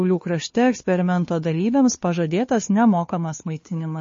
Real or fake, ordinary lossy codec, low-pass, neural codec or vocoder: fake; MP3, 32 kbps; 7.2 kHz; codec, 16 kHz, 2 kbps, FunCodec, trained on Chinese and English, 25 frames a second